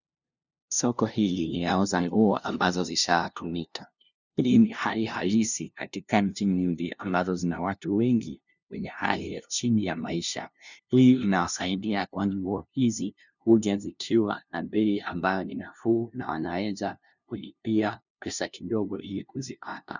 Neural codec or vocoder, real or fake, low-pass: codec, 16 kHz, 0.5 kbps, FunCodec, trained on LibriTTS, 25 frames a second; fake; 7.2 kHz